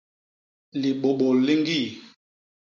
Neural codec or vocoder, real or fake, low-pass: none; real; 7.2 kHz